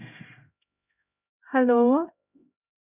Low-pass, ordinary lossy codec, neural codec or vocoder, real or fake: 3.6 kHz; none; codec, 16 kHz, 0.5 kbps, X-Codec, HuBERT features, trained on LibriSpeech; fake